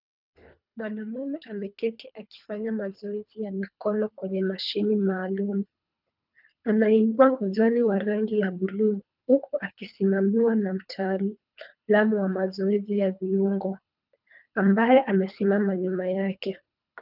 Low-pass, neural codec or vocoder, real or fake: 5.4 kHz; codec, 24 kHz, 3 kbps, HILCodec; fake